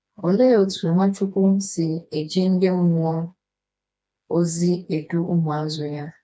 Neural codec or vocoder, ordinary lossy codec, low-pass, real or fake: codec, 16 kHz, 2 kbps, FreqCodec, smaller model; none; none; fake